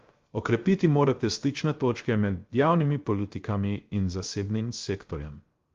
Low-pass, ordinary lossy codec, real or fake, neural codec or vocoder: 7.2 kHz; Opus, 32 kbps; fake; codec, 16 kHz, 0.3 kbps, FocalCodec